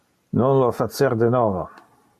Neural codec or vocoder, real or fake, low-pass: none; real; 14.4 kHz